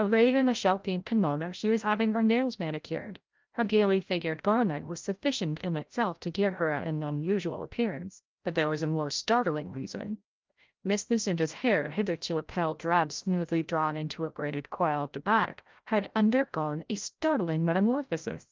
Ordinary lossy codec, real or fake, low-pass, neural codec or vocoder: Opus, 24 kbps; fake; 7.2 kHz; codec, 16 kHz, 0.5 kbps, FreqCodec, larger model